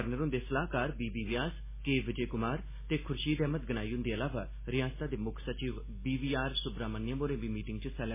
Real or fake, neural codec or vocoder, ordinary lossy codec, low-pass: real; none; MP3, 16 kbps; 3.6 kHz